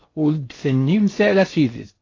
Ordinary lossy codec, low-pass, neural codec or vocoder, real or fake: AAC, 32 kbps; 7.2 kHz; codec, 16 kHz in and 24 kHz out, 0.8 kbps, FocalCodec, streaming, 65536 codes; fake